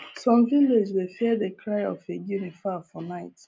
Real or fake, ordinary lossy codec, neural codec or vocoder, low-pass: real; none; none; none